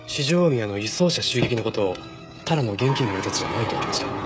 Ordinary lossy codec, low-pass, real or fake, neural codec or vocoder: none; none; fake; codec, 16 kHz, 16 kbps, FreqCodec, smaller model